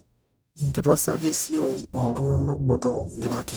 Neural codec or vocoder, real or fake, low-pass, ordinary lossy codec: codec, 44.1 kHz, 0.9 kbps, DAC; fake; none; none